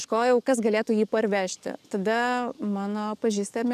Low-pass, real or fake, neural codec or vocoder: 14.4 kHz; fake; codec, 44.1 kHz, 7.8 kbps, DAC